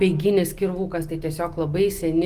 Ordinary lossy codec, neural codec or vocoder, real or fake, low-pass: Opus, 24 kbps; none; real; 14.4 kHz